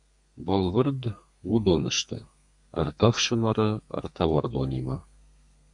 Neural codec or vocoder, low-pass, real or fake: codec, 32 kHz, 1.9 kbps, SNAC; 10.8 kHz; fake